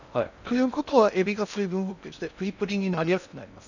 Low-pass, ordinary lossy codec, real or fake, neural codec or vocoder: 7.2 kHz; none; fake; codec, 16 kHz in and 24 kHz out, 0.6 kbps, FocalCodec, streaming, 2048 codes